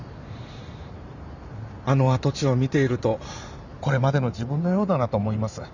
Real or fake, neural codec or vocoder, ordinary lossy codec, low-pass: fake; vocoder, 44.1 kHz, 128 mel bands every 512 samples, BigVGAN v2; none; 7.2 kHz